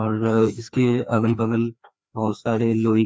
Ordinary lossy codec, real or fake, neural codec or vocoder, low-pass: none; fake; codec, 16 kHz, 2 kbps, FreqCodec, larger model; none